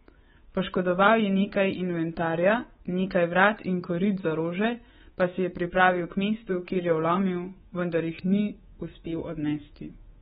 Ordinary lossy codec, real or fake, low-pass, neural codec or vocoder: AAC, 16 kbps; fake; 10.8 kHz; codec, 24 kHz, 3.1 kbps, DualCodec